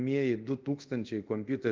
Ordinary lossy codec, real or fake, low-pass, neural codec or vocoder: Opus, 24 kbps; fake; 7.2 kHz; codec, 24 kHz, 0.5 kbps, DualCodec